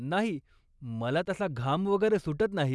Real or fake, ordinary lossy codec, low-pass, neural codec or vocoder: real; none; none; none